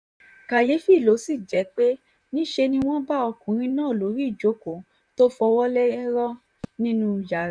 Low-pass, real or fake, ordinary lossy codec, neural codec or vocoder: 9.9 kHz; fake; none; vocoder, 44.1 kHz, 128 mel bands, Pupu-Vocoder